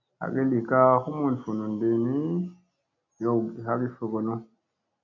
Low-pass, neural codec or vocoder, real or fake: 7.2 kHz; none; real